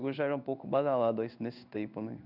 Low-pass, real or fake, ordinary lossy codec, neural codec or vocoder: 5.4 kHz; fake; none; codec, 16 kHz in and 24 kHz out, 1 kbps, XY-Tokenizer